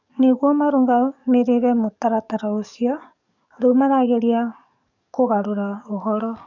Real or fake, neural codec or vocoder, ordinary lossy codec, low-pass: fake; codec, 44.1 kHz, 7.8 kbps, DAC; none; 7.2 kHz